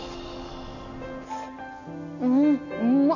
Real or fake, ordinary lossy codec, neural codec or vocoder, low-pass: real; none; none; 7.2 kHz